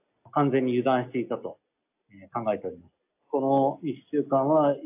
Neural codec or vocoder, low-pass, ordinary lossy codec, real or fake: none; 3.6 kHz; AAC, 32 kbps; real